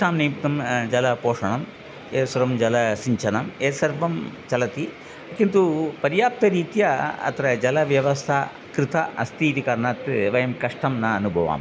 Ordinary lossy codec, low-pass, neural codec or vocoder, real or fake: none; none; none; real